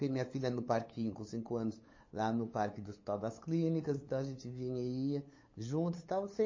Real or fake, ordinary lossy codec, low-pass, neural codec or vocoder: fake; MP3, 32 kbps; 7.2 kHz; codec, 16 kHz, 8 kbps, FunCodec, trained on LibriTTS, 25 frames a second